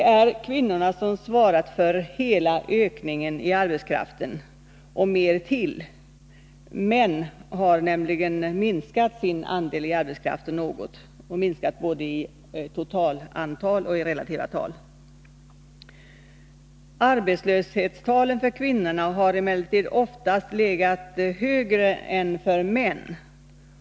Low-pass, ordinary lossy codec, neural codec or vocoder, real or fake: none; none; none; real